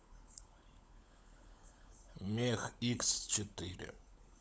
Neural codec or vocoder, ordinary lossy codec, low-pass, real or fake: codec, 16 kHz, 16 kbps, FunCodec, trained on LibriTTS, 50 frames a second; none; none; fake